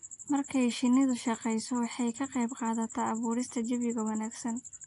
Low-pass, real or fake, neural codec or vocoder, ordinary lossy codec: 10.8 kHz; real; none; AAC, 48 kbps